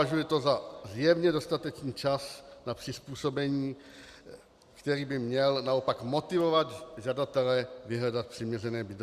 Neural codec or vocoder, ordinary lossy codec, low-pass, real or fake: none; Opus, 64 kbps; 14.4 kHz; real